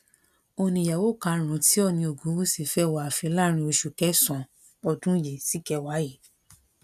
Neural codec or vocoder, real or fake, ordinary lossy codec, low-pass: none; real; none; 14.4 kHz